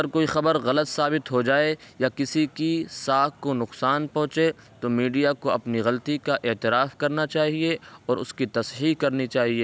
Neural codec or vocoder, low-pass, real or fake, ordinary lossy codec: none; none; real; none